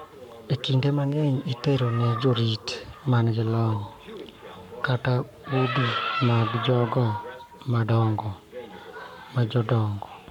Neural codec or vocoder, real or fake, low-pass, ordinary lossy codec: codec, 44.1 kHz, 7.8 kbps, Pupu-Codec; fake; 19.8 kHz; none